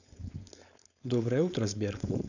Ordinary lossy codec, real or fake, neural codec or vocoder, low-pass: none; fake; codec, 16 kHz, 4.8 kbps, FACodec; 7.2 kHz